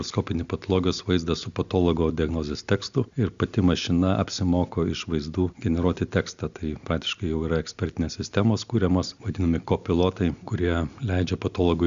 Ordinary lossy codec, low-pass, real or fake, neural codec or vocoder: Opus, 64 kbps; 7.2 kHz; real; none